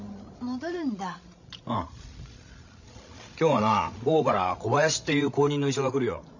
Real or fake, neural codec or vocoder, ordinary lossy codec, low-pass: fake; codec, 16 kHz, 16 kbps, FreqCodec, larger model; none; 7.2 kHz